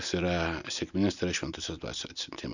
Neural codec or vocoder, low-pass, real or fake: none; 7.2 kHz; real